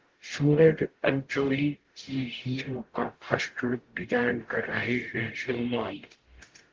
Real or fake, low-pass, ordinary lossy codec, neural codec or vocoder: fake; 7.2 kHz; Opus, 16 kbps; codec, 44.1 kHz, 0.9 kbps, DAC